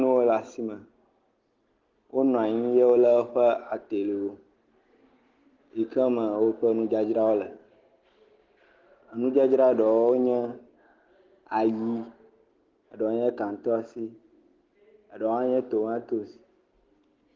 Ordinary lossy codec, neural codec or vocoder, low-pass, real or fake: Opus, 16 kbps; none; 7.2 kHz; real